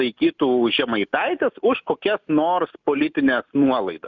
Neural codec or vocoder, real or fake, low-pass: none; real; 7.2 kHz